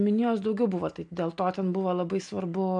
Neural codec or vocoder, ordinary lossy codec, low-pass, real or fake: none; AAC, 64 kbps; 9.9 kHz; real